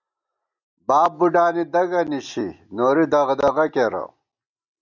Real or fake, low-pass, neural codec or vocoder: real; 7.2 kHz; none